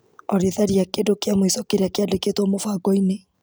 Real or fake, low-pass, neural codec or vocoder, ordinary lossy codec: real; none; none; none